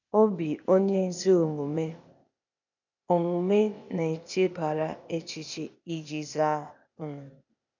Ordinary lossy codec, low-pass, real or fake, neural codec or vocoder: none; 7.2 kHz; fake; codec, 16 kHz, 0.8 kbps, ZipCodec